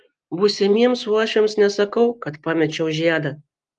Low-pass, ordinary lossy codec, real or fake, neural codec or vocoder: 10.8 kHz; Opus, 24 kbps; real; none